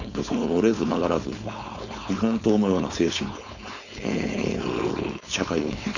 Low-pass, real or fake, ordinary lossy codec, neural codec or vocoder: 7.2 kHz; fake; none; codec, 16 kHz, 4.8 kbps, FACodec